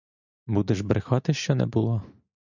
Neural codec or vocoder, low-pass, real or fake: none; 7.2 kHz; real